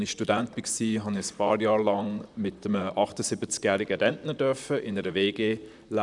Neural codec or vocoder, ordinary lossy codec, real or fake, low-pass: vocoder, 44.1 kHz, 128 mel bands, Pupu-Vocoder; none; fake; 10.8 kHz